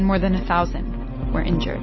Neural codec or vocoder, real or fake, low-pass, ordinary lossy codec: none; real; 7.2 kHz; MP3, 24 kbps